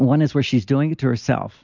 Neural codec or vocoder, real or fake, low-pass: none; real; 7.2 kHz